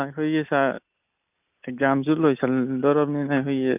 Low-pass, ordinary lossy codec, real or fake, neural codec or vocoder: 3.6 kHz; none; real; none